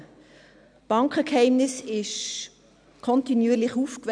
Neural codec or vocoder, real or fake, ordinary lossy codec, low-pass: none; real; none; 9.9 kHz